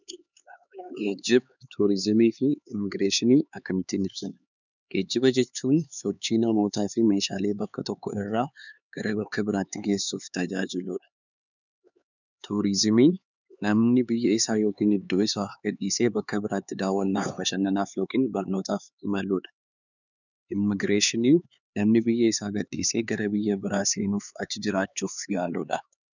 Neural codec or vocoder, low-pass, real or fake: codec, 16 kHz, 4 kbps, X-Codec, HuBERT features, trained on LibriSpeech; 7.2 kHz; fake